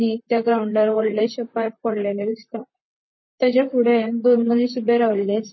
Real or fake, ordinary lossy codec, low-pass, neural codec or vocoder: fake; MP3, 24 kbps; 7.2 kHz; vocoder, 44.1 kHz, 128 mel bands, Pupu-Vocoder